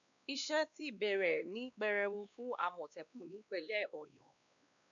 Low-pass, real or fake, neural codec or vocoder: 7.2 kHz; fake; codec, 16 kHz, 2 kbps, X-Codec, WavLM features, trained on Multilingual LibriSpeech